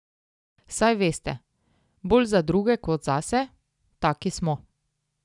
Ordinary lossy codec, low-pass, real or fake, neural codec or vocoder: none; 10.8 kHz; real; none